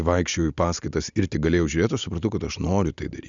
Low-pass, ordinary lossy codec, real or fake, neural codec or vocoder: 7.2 kHz; Opus, 64 kbps; real; none